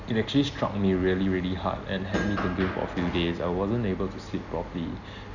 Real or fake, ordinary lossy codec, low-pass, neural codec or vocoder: real; none; 7.2 kHz; none